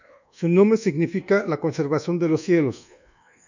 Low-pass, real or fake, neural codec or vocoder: 7.2 kHz; fake; codec, 24 kHz, 1.2 kbps, DualCodec